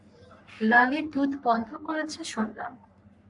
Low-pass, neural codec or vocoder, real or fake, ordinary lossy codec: 10.8 kHz; codec, 44.1 kHz, 3.4 kbps, Pupu-Codec; fake; AAC, 64 kbps